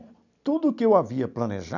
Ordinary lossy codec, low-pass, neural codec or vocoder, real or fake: none; 7.2 kHz; none; real